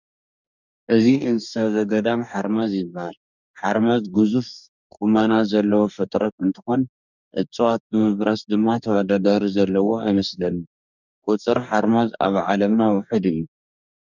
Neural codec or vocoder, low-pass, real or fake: codec, 44.1 kHz, 2.6 kbps, DAC; 7.2 kHz; fake